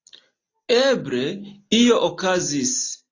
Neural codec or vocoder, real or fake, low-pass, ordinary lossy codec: none; real; 7.2 kHz; AAC, 32 kbps